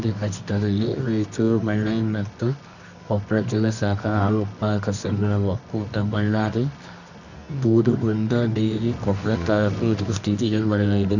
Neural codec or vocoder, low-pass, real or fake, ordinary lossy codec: codec, 24 kHz, 0.9 kbps, WavTokenizer, medium music audio release; 7.2 kHz; fake; none